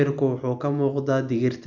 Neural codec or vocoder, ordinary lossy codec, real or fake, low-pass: none; none; real; 7.2 kHz